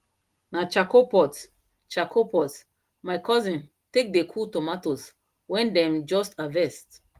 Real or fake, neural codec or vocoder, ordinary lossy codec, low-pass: real; none; Opus, 24 kbps; 14.4 kHz